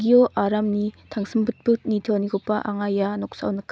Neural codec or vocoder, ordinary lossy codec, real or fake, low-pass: none; none; real; none